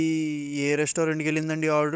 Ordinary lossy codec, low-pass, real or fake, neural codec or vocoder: none; none; fake; codec, 16 kHz, 16 kbps, FunCodec, trained on Chinese and English, 50 frames a second